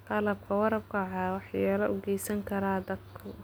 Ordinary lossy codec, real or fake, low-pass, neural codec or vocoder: none; real; none; none